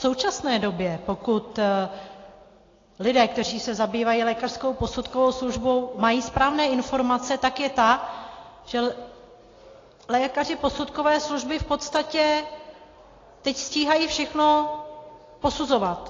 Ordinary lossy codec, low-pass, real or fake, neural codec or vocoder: AAC, 32 kbps; 7.2 kHz; real; none